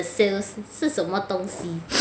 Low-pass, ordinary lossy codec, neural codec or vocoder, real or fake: none; none; none; real